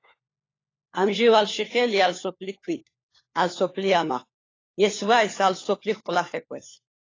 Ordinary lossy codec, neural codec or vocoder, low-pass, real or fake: AAC, 32 kbps; codec, 16 kHz, 4 kbps, FunCodec, trained on LibriTTS, 50 frames a second; 7.2 kHz; fake